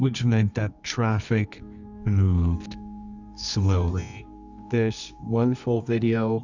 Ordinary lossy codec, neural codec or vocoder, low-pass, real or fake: Opus, 64 kbps; codec, 24 kHz, 0.9 kbps, WavTokenizer, medium music audio release; 7.2 kHz; fake